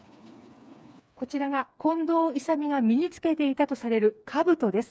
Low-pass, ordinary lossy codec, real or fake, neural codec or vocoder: none; none; fake; codec, 16 kHz, 4 kbps, FreqCodec, smaller model